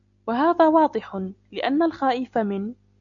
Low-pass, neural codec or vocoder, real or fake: 7.2 kHz; none; real